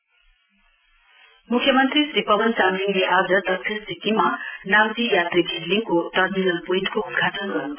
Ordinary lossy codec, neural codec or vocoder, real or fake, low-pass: none; none; real; 3.6 kHz